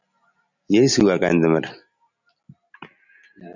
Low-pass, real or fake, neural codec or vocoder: 7.2 kHz; real; none